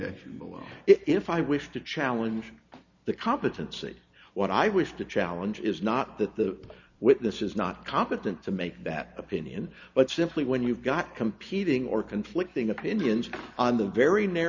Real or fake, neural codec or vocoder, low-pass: real; none; 7.2 kHz